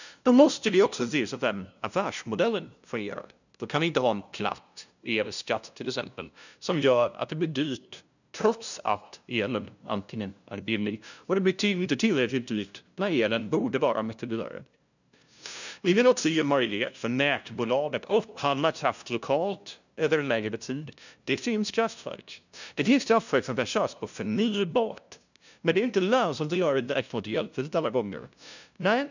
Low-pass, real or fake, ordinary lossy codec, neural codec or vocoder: 7.2 kHz; fake; none; codec, 16 kHz, 0.5 kbps, FunCodec, trained on LibriTTS, 25 frames a second